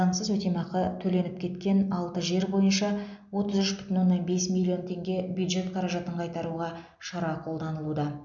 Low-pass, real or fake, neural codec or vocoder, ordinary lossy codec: 7.2 kHz; real; none; none